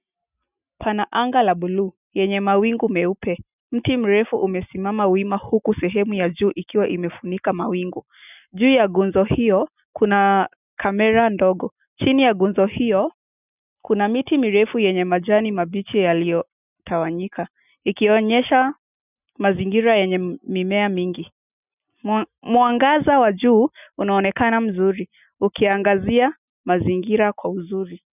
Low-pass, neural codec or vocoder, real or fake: 3.6 kHz; none; real